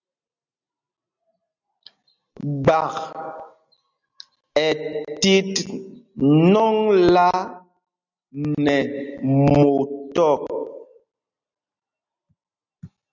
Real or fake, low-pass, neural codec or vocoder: real; 7.2 kHz; none